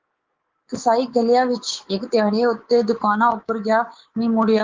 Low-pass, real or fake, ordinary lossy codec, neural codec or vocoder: 7.2 kHz; fake; Opus, 16 kbps; codec, 24 kHz, 3.1 kbps, DualCodec